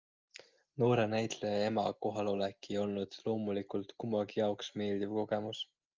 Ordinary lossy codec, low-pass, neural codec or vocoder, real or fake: Opus, 24 kbps; 7.2 kHz; none; real